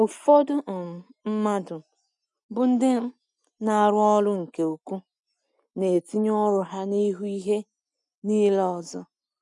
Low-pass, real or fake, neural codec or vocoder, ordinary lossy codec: 10.8 kHz; real; none; none